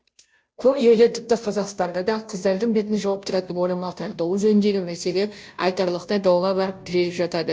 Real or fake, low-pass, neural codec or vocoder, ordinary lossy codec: fake; none; codec, 16 kHz, 0.5 kbps, FunCodec, trained on Chinese and English, 25 frames a second; none